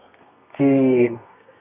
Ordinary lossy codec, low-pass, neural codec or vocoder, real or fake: none; 3.6 kHz; codec, 32 kHz, 1.9 kbps, SNAC; fake